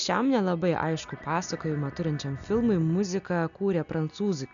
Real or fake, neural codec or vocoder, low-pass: real; none; 7.2 kHz